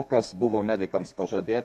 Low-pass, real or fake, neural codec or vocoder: 14.4 kHz; fake; codec, 32 kHz, 1.9 kbps, SNAC